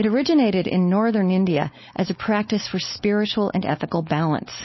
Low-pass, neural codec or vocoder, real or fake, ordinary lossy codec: 7.2 kHz; codec, 16 kHz, 4.8 kbps, FACodec; fake; MP3, 24 kbps